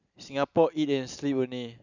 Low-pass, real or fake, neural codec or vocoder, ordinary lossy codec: 7.2 kHz; real; none; none